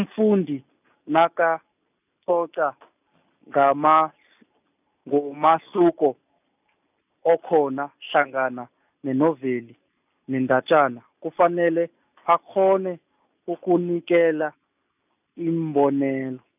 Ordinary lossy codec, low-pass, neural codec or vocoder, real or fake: none; 3.6 kHz; none; real